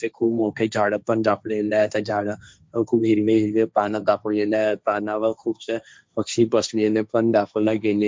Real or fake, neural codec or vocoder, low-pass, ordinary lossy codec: fake; codec, 16 kHz, 1.1 kbps, Voila-Tokenizer; none; none